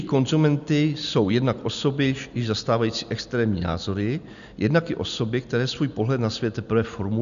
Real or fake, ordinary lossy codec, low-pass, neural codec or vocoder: real; MP3, 96 kbps; 7.2 kHz; none